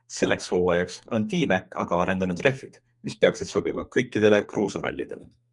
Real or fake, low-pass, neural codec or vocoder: fake; 10.8 kHz; codec, 32 kHz, 1.9 kbps, SNAC